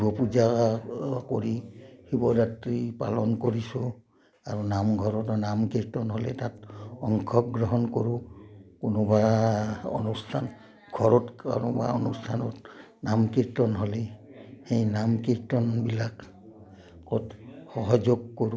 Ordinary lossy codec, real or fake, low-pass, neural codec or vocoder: none; real; none; none